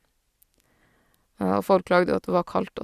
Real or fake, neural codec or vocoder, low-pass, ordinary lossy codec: real; none; 14.4 kHz; Opus, 64 kbps